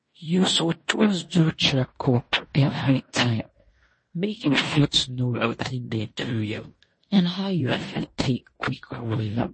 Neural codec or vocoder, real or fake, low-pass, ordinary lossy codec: codec, 16 kHz in and 24 kHz out, 0.9 kbps, LongCat-Audio-Codec, fine tuned four codebook decoder; fake; 9.9 kHz; MP3, 32 kbps